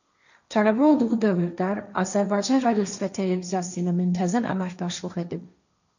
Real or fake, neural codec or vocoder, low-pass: fake; codec, 16 kHz, 1.1 kbps, Voila-Tokenizer; 7.2 kHz